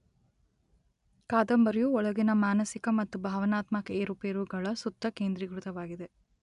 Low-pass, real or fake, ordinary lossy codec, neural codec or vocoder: 10.8 kHz; real; none; none